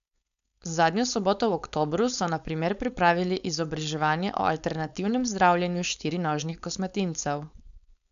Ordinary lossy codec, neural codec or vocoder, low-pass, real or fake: none; codec, 16 kHz, 4.8 kbps, FACodec; 7.2 kHz; fake